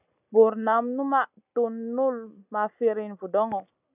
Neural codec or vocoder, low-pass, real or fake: none; 3.6 kHz; real